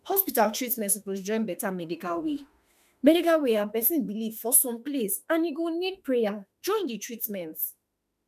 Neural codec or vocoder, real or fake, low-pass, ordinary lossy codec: autoencoder, 48 kHz, 32 numbers a frame, DAC-VAE, trained on Japanese speech; fake; 14.4 kHz; none